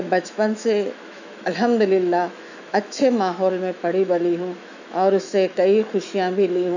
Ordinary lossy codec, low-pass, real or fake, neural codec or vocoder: none; 7.2 kHz; fake; autoencoder, 48 kHz, 128 numbers a frame, DAC-VAE, trained on Japanese speech